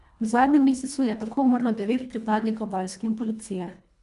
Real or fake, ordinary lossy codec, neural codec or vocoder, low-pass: fake; none; codec, 24 kHz, 1.5 kbps, HILCodec; 10.8 kHz